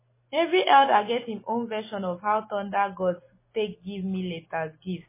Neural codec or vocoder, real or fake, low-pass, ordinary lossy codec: none; real; 3.6 kHz; MP3, 16 kbps